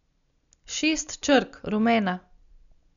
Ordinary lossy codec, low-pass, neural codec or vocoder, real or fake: none; 7.2 kHz; none; real